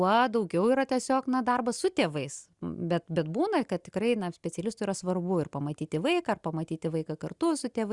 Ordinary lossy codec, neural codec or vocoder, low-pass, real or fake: Opus, 64 kbps; none; 10.8 kHz; real